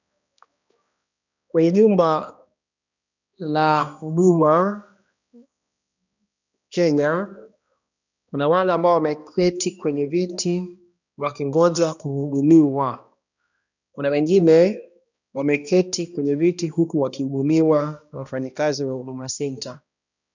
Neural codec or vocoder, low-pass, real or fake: codec, 16 kHz, 1 kbps, X-Codec, HuBERT features, trained on balanced general audio; 7.2 kHz; fake